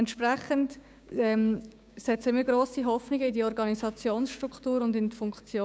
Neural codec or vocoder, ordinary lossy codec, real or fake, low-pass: codec, 16 kHz, 6 kbps, DAC; none; fake; none